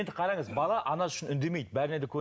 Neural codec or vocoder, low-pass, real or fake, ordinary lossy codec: none; none; real; none